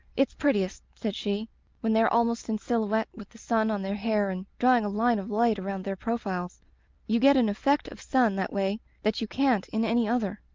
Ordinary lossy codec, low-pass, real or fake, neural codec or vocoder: Opus, 32 kbps; 7.2 kHz; real; none